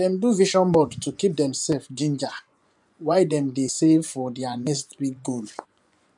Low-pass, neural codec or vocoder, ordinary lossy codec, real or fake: 10.8 kHz; vocoder, 24 kHz, 100 mel bands, Vocos; none; fake